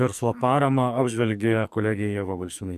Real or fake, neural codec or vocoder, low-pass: fake; codec, 44.1 kHz, 2.6 kbps, SNAC; 14.4 kHz